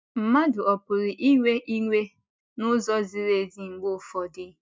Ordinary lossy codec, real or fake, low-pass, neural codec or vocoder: none; real; none; none